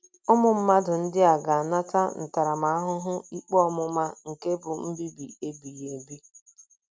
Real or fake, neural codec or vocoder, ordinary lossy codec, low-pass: real; none; none; none